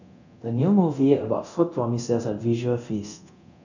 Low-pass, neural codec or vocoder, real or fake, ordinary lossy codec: 7.2 kHz; codec, 24 kHz, 0.9 kbps, DualCodec; fake; none